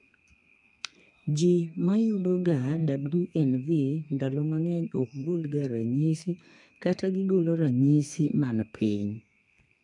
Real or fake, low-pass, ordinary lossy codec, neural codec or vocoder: fake; 10.8 kHz; none; codec, 32 kHz, 1.9 kbps, SNAC